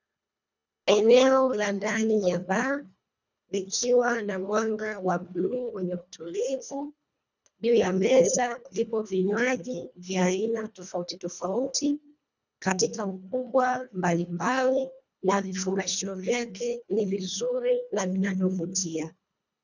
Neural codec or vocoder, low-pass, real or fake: codec, 24 kHz, 1.5 kbps, HILCodec; 7.2 kHz; fake